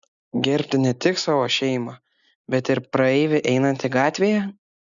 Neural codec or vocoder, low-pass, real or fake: none; 7.2 kHz; real